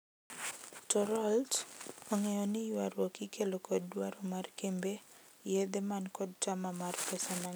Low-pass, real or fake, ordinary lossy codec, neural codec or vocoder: none; real; none; none